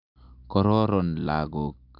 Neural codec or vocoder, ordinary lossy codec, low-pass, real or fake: none; none; 5.4 kHz; real